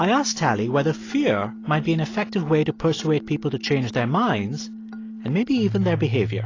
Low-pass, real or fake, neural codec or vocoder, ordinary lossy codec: 7.2 kHz; real; none; AAC, 32 kbps